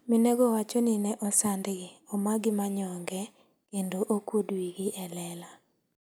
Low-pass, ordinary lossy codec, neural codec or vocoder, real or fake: none; none; none; real